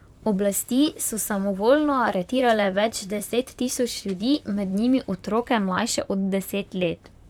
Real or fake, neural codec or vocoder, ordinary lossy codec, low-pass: fake; vocoder, 44.1 kHz, 128 mel bands, Pupu-Vocoder; none; 19.8 kHz